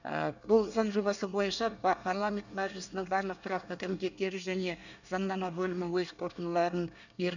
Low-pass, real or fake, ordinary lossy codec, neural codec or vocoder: 7.2 kHz; fake; none; codec, 24 kHz, 1 kbps, SNAC